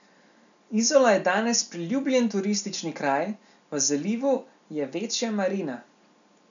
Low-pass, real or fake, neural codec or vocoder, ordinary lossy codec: 7.2 kHz; real; none; none